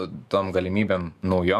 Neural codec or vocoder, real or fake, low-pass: autoencoder, 48 kHz, 128 numbers a frame, DAC-VAE, trained on Japanese speech; fake; 14.4 kHz